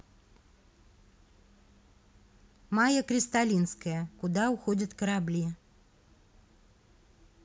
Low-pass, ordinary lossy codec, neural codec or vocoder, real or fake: none; none; none; real